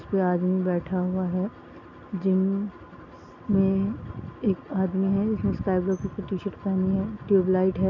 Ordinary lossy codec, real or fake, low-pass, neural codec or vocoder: none; real; 7.2 kHz; none